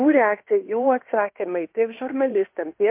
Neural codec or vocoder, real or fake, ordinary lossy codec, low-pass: codec, 16 kHz in and 24 kHz out, 0.9 kbps, LongCat-Audio-Codec, fine tuned four codebook decoder; fake; MP3, 32 kbps; 3.6 kHz